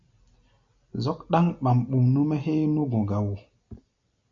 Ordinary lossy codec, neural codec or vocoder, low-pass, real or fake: MP3, 48 kbps; none; 7.2 kHz; real